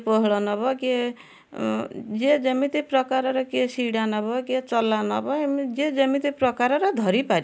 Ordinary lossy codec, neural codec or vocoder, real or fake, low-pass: none; none; real; none